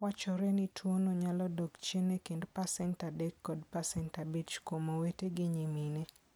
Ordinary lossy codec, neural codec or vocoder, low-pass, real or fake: none; none; none; real